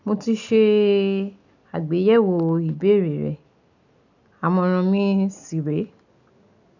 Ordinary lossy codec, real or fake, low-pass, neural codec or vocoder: none; real; 7.2 kHz; none